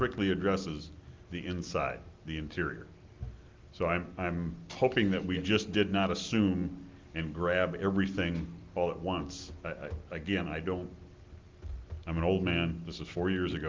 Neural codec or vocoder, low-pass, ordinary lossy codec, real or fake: none; 7.2 kHz; Opus, 24 kbps; real